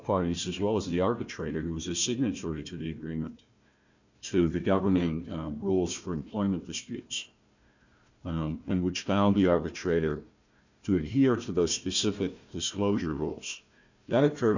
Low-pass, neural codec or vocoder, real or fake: 7.2 kHz; codec, 16 kHz, 1 kbps, FunCodec, trained on Chinese and English, 50 frames a second; fake